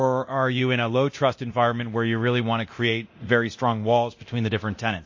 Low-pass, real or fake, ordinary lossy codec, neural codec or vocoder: 7.2 kHz; fake; MP3, 32 kbps; codec, 24 kHz, 0.9 kbps, DualCodec